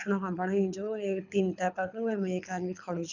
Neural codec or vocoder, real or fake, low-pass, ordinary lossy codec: codec, 24 kHz, 6 kbps, HILCodec; fake; 7.2 kHz; none